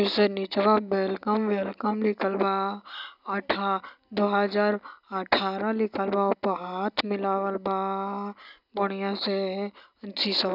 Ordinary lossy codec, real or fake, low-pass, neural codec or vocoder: none; real; 5.4 kHz; none